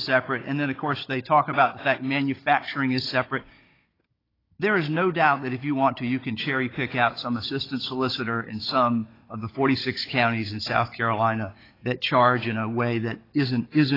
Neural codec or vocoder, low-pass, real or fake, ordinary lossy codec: codec, 16 kHz, 16 kbps, FunCodec, trained on Chinese and English, 50 frames a second; 5.4 kHz; fake; AAC, 24 kbps